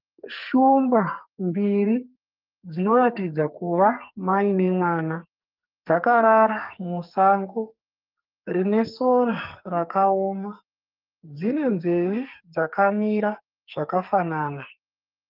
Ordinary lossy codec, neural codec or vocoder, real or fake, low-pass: Opus, 24 kbps; codec, 44.1 kHz, 2.6 kbps, SNAC; fake; 5.4 kHz